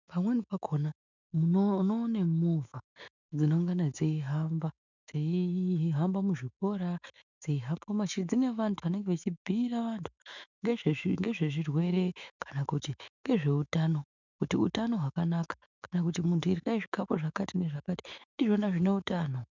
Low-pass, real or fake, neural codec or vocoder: 7.2 kHz; fake; autoencoder, 48 kHz, 128 numbers a frame, DAC-VAE, trained on Japanese speech